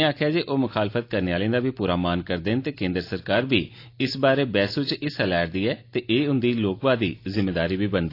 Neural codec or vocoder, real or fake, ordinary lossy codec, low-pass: none; real; AAC, 32 kbps; 5.4 kHz